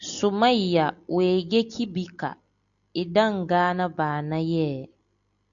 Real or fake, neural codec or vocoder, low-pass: real; none; 7.2 kHz